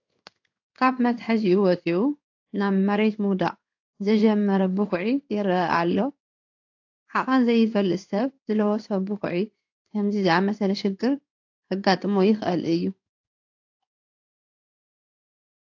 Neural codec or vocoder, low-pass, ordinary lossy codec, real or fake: codec, 16 kHz in and 24 kHz out, 1 kbps, XY-Tokenizer; 7.2 kHz; AAC, 48 kbps; fake